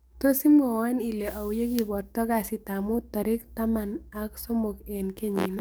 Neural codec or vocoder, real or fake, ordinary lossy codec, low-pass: codec, 44.1 kHz, 7.8 kbps, DAC; fake; none; none